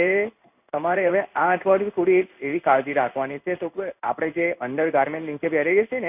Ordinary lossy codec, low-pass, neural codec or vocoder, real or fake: none; 3.6 kHz; codec, 16 kHz in and 24 kHz out, 1 kbps, XY-Tokenizer; fake